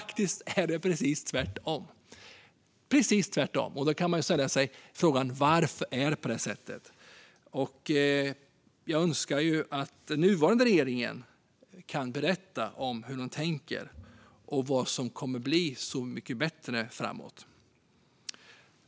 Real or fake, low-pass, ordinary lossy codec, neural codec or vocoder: real; none; none; none